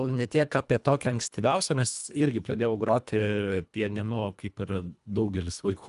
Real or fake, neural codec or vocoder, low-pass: fake; codec, 24 kHz, 1.5 kbps, HILCodec; 10.8 kHz